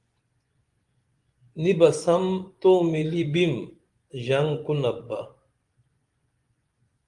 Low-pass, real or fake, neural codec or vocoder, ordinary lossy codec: 10.8 kHz; fake; vocoder, 24 kHz, 100 mel bands, Vocos; Opus, 24 kbps